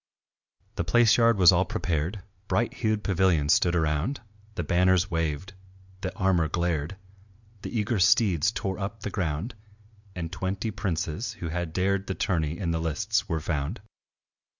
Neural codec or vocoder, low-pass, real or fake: none; 7.2 kHz; real